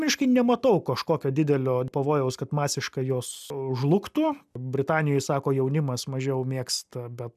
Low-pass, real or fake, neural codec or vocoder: 14.4 kHz; real; none